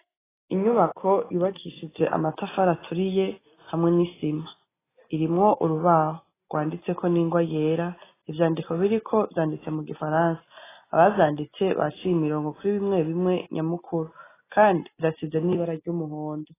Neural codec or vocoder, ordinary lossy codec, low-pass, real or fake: none; AAC, 16 kbps; 3.6 kHz; real